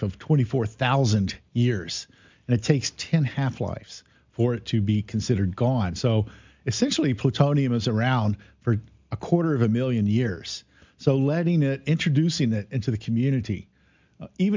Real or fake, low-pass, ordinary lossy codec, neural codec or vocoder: real; 7.2 kHz; MP3, 64 kbps; none